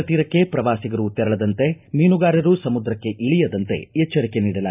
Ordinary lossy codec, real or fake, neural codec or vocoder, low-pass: none; real; none; 3.6 kHz